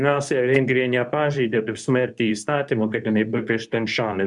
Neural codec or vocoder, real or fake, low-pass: codec, 24 kHz, 0.9 kbps, WavTokenizer, medium speech release version 2; fake; 10.8 kHz